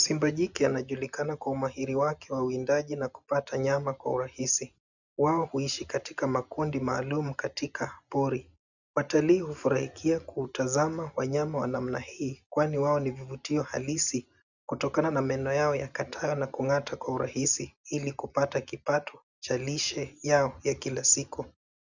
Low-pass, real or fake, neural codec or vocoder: 7.2 kHz; real; none